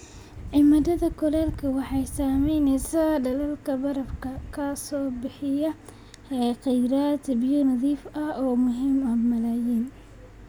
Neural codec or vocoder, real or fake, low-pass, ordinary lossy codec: none; real; none; none